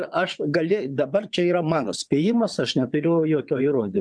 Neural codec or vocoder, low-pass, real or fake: vocoder, 22.05 kHz, 80 mel bands, WaveNeXt; 9.9 kHz; fake